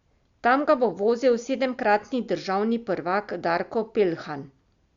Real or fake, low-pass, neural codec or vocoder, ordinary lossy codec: real; 7.2 kHz; none; Opus, 64 kbps